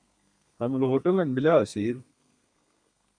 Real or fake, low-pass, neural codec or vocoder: fake; 9.9 kHz; codec, 32 kHz, 1.9 kbps, SNAC